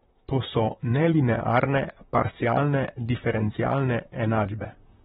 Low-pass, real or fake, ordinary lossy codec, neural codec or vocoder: 19.8 kHz; fake; AAC, 16 kbps; vocoder, 44.1 kHz, 128 mel bands, Pupu-Vocoder